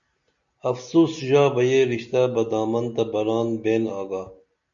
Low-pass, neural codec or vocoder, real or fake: 7.2 kHz; none; real